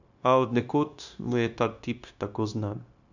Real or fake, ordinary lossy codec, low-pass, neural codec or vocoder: fake; none; 7.2 kHz; codec, 16 kHz, 0.9 kbps, LongCat-Audio-Codec